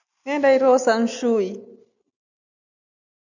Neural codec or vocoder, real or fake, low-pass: none; real; 7.2 kHz